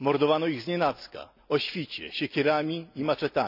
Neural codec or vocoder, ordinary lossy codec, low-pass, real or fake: none; none; 5.4 kHz; real